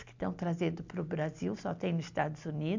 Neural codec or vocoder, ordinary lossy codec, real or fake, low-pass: none; MP3, 64 kbps; real; 7.2 kHz